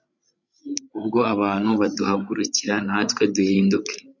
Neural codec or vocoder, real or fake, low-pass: codec, 16 kHz, 16 kbps, FreqCodec, larger model; fake; 7.2 kHz